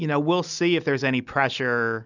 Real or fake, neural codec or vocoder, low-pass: real; none; 7.2 kHz